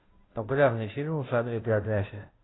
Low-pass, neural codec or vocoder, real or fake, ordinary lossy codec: 7.2 kHz; codec, 16 kHz, 0.5 kbps, FunCodec, trained on Chinese and English, 25 frames a second; fake; AAC, 16 kbps